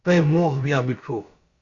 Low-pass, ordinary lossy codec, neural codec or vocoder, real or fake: 7.2 kHz; Opus, 64 kbps; codec, 16 kHz, about 1 kbps, DyCAST, with the encoder's durations; fake